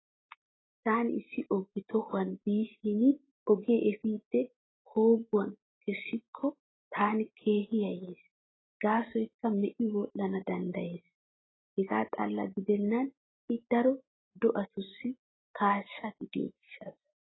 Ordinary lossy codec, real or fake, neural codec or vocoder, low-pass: AAC, 16 kbps; real; none; 7.2 kHz